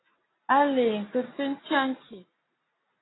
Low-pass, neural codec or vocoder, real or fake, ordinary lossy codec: 7.2 kHz; none; real; AAC, 16 kbps